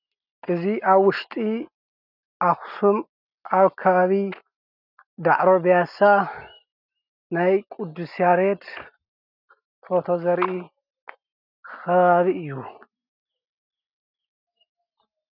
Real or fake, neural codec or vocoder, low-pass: real; none; 5.4 kHz